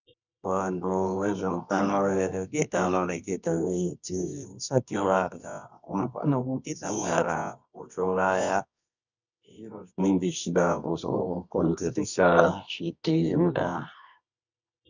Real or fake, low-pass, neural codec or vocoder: fake; 7.2 kHz; codec, 24 kHz, 0.9 kbps, WavTokenizer, medium music audio release